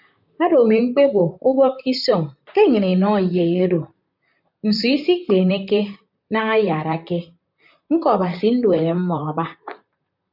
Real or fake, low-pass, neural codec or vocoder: fake; 5.4 kHz; vocoder, 44.1 kHz, 128 mel bands, Pupu-Vocoder